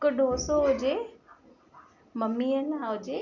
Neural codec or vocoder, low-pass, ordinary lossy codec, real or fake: none; 7.2 kHz; none; real